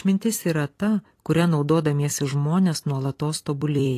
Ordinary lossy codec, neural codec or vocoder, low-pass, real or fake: AAC, 48 kbps; vocoder, 44.1 kHz, 128 mel bands every 256 samples, BigVGAN v2; 14.4 kHz; fake